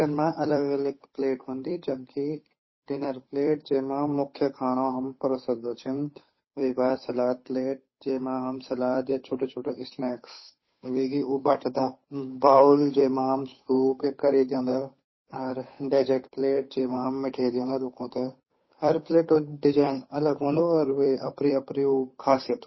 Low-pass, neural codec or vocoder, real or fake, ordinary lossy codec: 7.2 kHz; codec, 16 kHz, 8 kbps, FunCodec, trained on Chinese and English, 25 frames a second; fake; MP3, 24 kbps